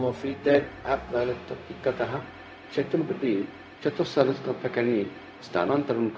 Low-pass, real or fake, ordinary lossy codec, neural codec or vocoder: none; fake; none; codec, 16 kHz, 0.4 kbps, LongCat-Audio-Codec